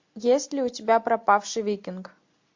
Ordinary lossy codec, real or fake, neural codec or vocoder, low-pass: MP3, 48 kbps; real; none; 7.2 kHz